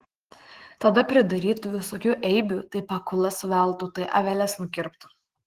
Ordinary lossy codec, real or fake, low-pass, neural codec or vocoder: Opus, 16 kbps; fake; 14.4 kHz; autoencoder, 48 kHz, 128 numbers a frame, DAC-VAE, trained on Japanese speech